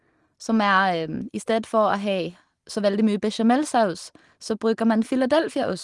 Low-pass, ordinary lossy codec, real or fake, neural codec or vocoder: 10.8 kHz; Opus, 24 kbps; real; none